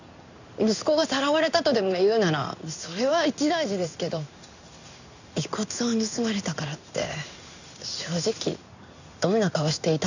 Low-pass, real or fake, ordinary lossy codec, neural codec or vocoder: 7.2 kHz; fake; none; codec, 16 kHz in and 24 kHz out, 1 kbps, XY-Tokenizer